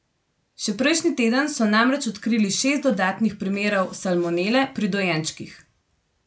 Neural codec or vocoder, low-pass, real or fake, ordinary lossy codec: none; none; real; none